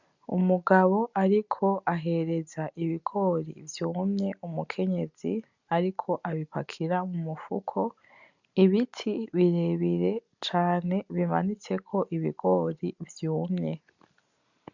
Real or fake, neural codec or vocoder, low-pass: real; none; 7.2 kHz